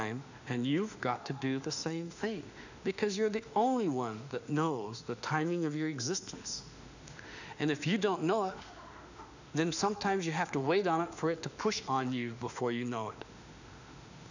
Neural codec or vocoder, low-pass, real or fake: autoencoder, 48 kHz, 32 numbers a frame, DAC-VAE, trained on Japanese speech; 7.2 kHz; fake